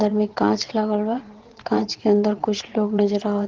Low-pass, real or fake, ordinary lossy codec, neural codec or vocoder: 7.2 kHz; fake; Opus, 16 kbps; vocoder, 22.05 kHz, 80 mel bands, WaveNeXt